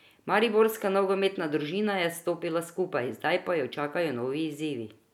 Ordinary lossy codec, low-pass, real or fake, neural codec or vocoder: none; 19.8 kHz; real; none